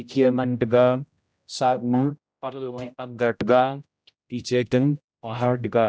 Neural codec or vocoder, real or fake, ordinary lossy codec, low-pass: codec, 16 kHz, 0.5 kbps, X-Codec, HuBERT features, trained on general audio; fake; none; none